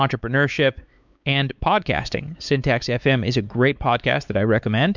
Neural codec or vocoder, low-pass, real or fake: codec, 16 kHz, 4 kbps, X-Codec, WavLM features, trained on Multilingual LibriSpeech; 7.2 kHz; fake